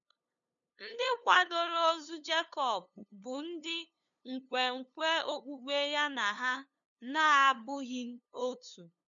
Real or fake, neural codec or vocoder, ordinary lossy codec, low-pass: fake; codec, 16 kHz, 2 kbps, FunCodec, trained on LibriTTS, 25 frames a second; none; 7.2 kHz